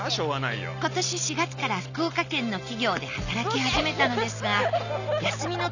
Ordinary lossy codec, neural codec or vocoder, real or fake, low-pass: none; none; real; 7.2 kHz